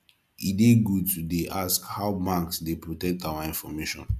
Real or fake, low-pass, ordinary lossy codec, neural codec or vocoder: real; 14.4 kHz; none; none